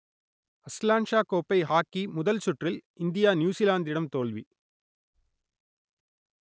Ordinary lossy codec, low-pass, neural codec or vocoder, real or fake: none; none; none; real